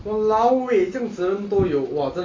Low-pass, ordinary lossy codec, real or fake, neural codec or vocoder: 7.2 kHz; AAC, 32 kbps; real; none